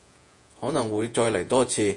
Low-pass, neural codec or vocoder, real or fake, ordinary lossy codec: 10.8 kHz; vocoder, 48 kHz, 128 mel bands, Vocos; fake; MP3, 48 kbps